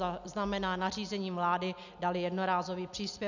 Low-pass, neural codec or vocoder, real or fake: 7.2 kHz; none; real